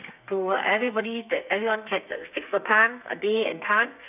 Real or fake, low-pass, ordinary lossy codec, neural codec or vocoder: fake; 3.6 kHz; none; codec, 32 kHz, 1.9 kbps, SNAC